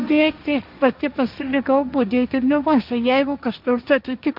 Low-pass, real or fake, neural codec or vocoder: 5.4 kHz; fake; codec, 16 kHz, 1.1 kbps, Voila-Tokenizer